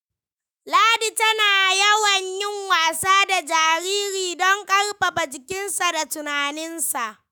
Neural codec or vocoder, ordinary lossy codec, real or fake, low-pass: autoencoder, 48 kHz, 128 numbers a frame, DAC-VAE, trained on Japanese speech; none; fake; none